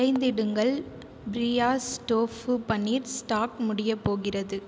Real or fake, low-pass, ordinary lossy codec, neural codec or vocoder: real; none; none; none